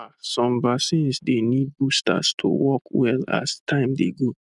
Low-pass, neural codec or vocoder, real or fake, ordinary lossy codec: 10.8 kHz; none; real; none